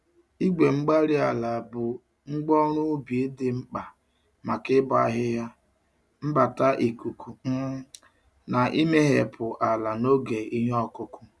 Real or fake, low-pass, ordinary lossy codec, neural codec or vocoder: real; none; none; none